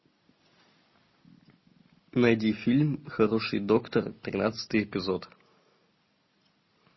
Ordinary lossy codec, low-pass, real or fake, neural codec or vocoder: MP3, 24 kbps; 7.2 kHz; real; none